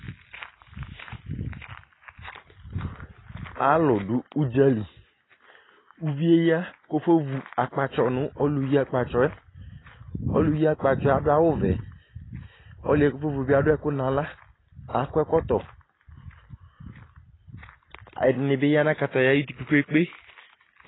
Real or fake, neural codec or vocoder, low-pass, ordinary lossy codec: real; none; 7.2 kHz; AAC, 16 kbps